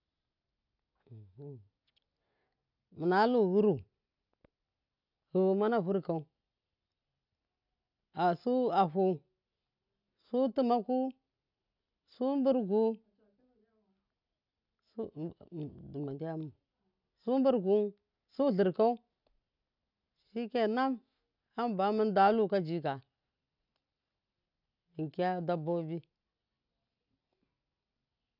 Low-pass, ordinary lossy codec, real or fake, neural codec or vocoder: 5.4 kHz; none; real; none